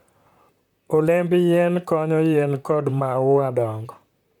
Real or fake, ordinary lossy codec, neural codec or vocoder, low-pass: fake; none; vocoder, 44.1 kHz, 128 mel bands, Pupu-Vocoder; 19.8 kHz